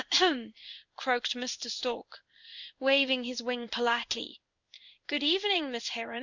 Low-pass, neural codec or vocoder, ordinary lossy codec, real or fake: 7.2 kHz; codec, 16 kHz in and 24 kHz out, 1 kbps, XY-Tokenizer; Opus, 64 kbps; fake